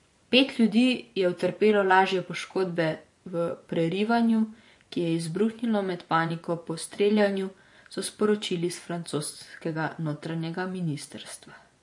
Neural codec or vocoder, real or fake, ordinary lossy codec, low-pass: vocoder, 24 kHz, 100 mel bands, Vocos; fake; MP3, 48 kbps; 10.8 kHz